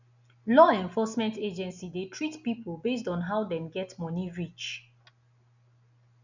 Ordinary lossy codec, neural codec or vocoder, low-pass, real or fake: none; none; 7.2 kHz; real